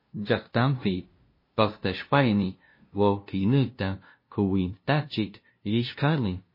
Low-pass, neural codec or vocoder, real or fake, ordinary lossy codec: 5.4 kHz; codec, 16 kHz, 0.5 kbps, FunCodec, trained on LibriTTS, 25 frames a second; fake; MP3, 24 kbps